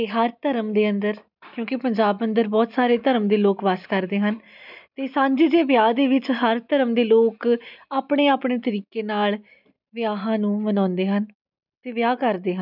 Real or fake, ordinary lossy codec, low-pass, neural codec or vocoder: real; none; 5.4 kHz; none